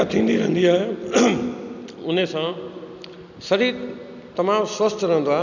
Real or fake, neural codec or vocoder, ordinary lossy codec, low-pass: real; none; none; 7.2 kHz